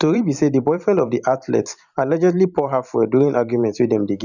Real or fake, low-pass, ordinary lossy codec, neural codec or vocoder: fake; 7.2 kHz; none; vocoder, 44.1 kHz, 128 mel bands every 512 samples, BigVGAN v2